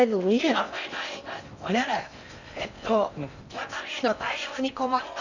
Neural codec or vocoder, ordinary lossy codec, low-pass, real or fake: codec, 16 kHz in and 24 kHz out, 0.6 kbps, FocalCodec, streaming, 4096 codes; none; 7.2 kHz; fake